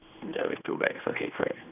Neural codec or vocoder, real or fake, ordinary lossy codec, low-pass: codec, 16 kHz, 2 kbps, X-Codec, HuBERT features, trained on general audio; fake; none; 3.6 kHz